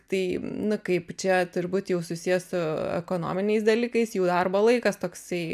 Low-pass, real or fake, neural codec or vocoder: 14.4 kHz; real; none